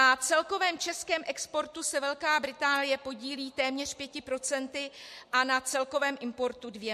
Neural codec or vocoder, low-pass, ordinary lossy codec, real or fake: none; 14.4 kHz; MP3, 64 kbps; real